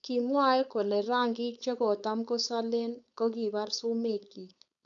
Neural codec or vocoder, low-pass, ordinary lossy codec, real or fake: codec, 16 kHz, 4.8 kbps, FACodec; 7.2 kHz; none; fake